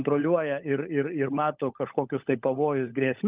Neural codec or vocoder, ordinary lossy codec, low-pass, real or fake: codec, 16 kHz, 16 kbps, FunCodec, trained on LibriTTS, 50 frames a second; Opus, 24 kbps; 3.6 kHz; fake